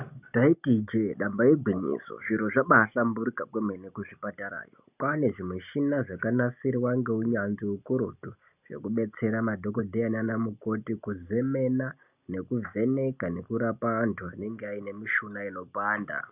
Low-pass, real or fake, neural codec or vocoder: 3.6 kHz; real; none